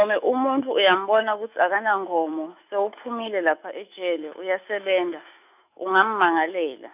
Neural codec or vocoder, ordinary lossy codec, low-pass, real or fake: autoencoder, 48 kHz, 128 numbers a frame, DAC-VAE, trained on Japanese speech; none; 3.6 kHz; fake